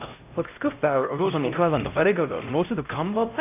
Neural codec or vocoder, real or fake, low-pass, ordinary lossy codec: codec, 16 kHz, 0.5 kbps, X-Codec, HuBERT features, trained on LibriSpeech; fake; 3.6 kHz; AAC, 32 kbps